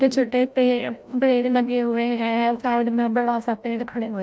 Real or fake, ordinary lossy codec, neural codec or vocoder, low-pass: fake; none; codec, 16 kHz, 0.5 kbps, FreqCodec, larger model; none